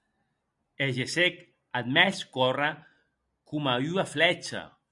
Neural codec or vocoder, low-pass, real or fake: none; 10.8 kHz; real